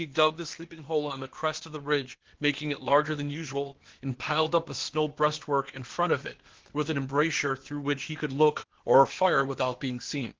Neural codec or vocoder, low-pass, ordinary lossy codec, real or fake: codec, 16 kHz, 0.8 kbps, ZipCodec; 7.2 kHz; Opus, 16 kbps; fake